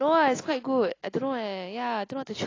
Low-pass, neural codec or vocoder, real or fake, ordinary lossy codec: 7.2 kHz; none; real; AAC, 32 kbps